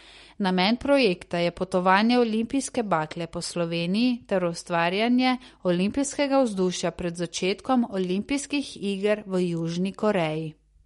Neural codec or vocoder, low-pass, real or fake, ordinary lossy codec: none; 19.8 kHz; real; MP3, 48 kbps